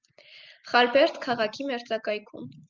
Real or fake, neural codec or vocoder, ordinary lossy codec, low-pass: real; none; Opus, 32 kbps; 7.2 kHz